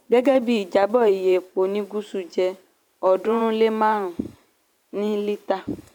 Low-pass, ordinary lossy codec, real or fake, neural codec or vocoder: 19.8 kHz; none; fake; vocoder, 44.1 kHz, 128 mel bands every 512 samples, BigVGAN v2